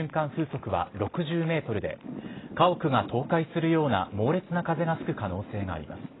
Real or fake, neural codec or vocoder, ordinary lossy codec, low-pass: real; none; AAC, 16 kbps; 7.2 kHz